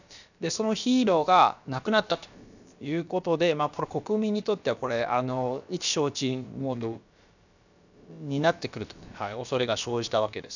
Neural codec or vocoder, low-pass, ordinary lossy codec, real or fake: codec, 16 kHz, about 1 kbps, DyCAST, with the encoder's durations; 7.2 kHz; none; fake